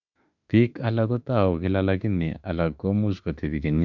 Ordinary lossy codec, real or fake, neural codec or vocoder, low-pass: none; fake; autoencoder, 48 kHz, 32 numbers a frame, DAC-VAE, trained on Japanese speech; 7.2 kHz